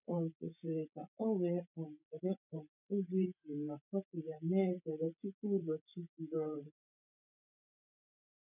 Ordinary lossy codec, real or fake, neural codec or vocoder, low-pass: none; fake; codec, 16 kHz, 8 kbps, FreqCodec, larger model; 3.6 kHz